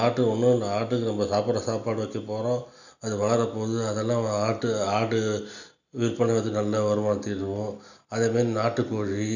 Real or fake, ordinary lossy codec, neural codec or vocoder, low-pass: real; none; none; 7.2 kHz